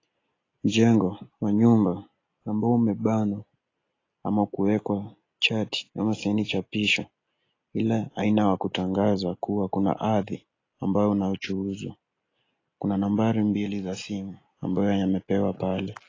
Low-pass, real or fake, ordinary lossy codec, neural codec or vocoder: 7.2 kHz; real; AAC, 32 kbps; none